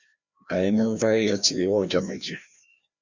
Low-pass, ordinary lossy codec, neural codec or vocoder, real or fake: 7.2 kHz; Opus, 64 kbps; codec, 16 kHz, 1 kbps, FreqCodec, larger model; fake